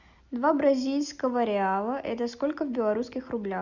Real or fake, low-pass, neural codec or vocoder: real; 7.2 kHz; none